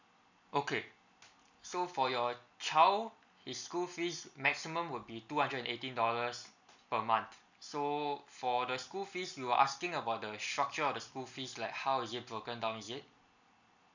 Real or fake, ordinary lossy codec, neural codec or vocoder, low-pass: real; none; none; 7.2 kHz